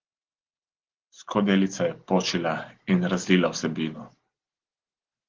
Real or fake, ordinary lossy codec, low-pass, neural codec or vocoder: real; Opus, 16 kbps; 7.2 kHz; none